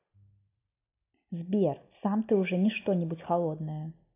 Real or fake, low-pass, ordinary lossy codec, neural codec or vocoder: real; 3.6 kHz; AAC, 24 kbps; none